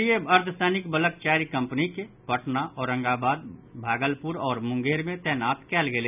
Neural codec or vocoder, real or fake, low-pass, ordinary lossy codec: none; real; 3.6 kHz; none